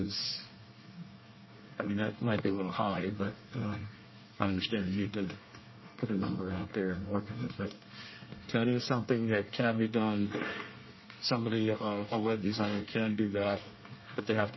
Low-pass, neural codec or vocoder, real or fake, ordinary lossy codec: 7.2 kHz; codec, 24 kHz, 1 kbps, SNAC; fake; MP3, 24 kbps